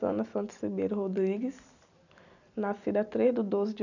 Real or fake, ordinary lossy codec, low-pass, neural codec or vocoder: real; none; 7.2 kHz; none